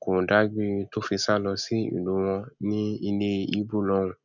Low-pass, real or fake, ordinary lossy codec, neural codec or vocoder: 7.2 kHz; real; none; none